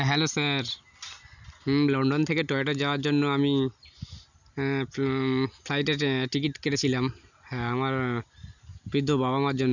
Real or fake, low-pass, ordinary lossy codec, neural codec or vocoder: real; 7.2 kHz; none; none